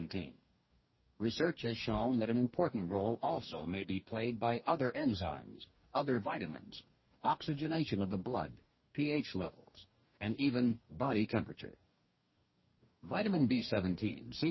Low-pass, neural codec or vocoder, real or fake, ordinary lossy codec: 7.2 kHz; codec, 44.1 kHz, 2.6 kbps, DAC; fake; MP3, 24 kbps